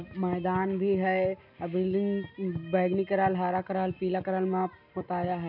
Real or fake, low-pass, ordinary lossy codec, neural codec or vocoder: real; 5.4 kHz; none; none